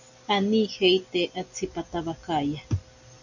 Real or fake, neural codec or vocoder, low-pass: real; none; 7.2 kHz